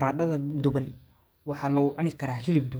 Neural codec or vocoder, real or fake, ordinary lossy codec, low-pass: codec, 44.1 kHz, 2.6 kbps, SNAC; fake; none; none